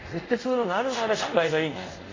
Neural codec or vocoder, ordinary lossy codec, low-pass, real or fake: codec, 24 kHz, 0.5 kbps, DualCodec; AAC, 32 kbps; 7.2 kHz; fake